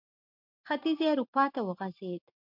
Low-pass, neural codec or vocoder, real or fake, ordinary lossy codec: 5.4 kHz; none; real; MP3, 48 kbps